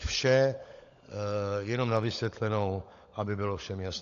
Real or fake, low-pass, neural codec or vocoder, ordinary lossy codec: fake; 7.2 kHz; codec, 16 kHz, 16 kbps, FunCodec, trained on LibriTTS, 50 frames a second; AAC, 48 kbps